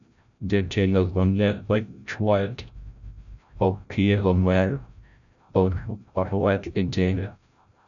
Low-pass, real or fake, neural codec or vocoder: 7.2 kHz; fake; codec, 16 kHz, 0.5 kbps, FreqCodec, larger model